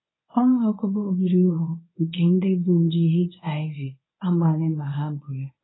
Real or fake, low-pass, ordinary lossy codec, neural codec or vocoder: fake; 7.2 kHz; AAC, 16 kbps; codec, 24 kHz, 0.9 kbps, WavTokenizer, medium speech release version 1